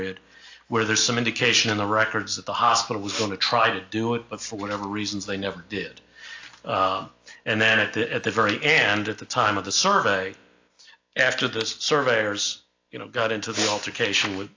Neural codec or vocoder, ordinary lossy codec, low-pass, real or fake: none; AAC, 48 kbps; 7.2 kHz; real